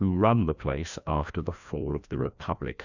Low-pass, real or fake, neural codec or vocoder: 7.2 kHz; fake; codec, 16 kHz, 1 kbps, FreqCodec, larger model